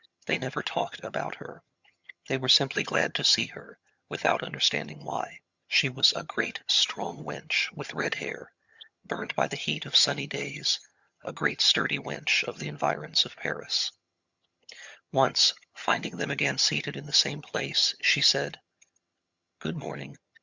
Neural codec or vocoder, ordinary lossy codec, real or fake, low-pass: vocoder, 22.05 kHz, 80 mel bands, HiFi-GAN; Opus, 64 kbps; fake; 7.2 kHz